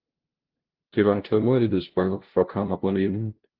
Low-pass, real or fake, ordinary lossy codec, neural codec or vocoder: 5.4 kHz; fake; Opus, 16 kbps; codec, 16 kHz, 0.5 kbps, FunCodec, trained on LibriTTS, 25 frames a second